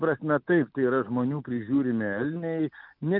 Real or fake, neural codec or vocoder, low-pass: real; none; 5.4 kHz